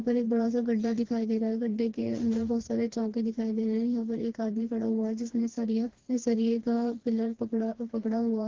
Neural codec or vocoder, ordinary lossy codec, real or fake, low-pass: codec, 16 kHz, 2 kbps, FreqCodec, smaller model; Opus, 16 kbps; fake; 7.2 kHz